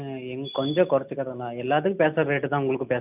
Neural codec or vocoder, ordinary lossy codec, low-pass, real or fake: none; none; 3.6 kHz; real